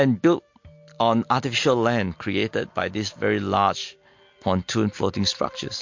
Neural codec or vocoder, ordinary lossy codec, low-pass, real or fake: none; MP3, 48 kbps; 7.2 kHz; real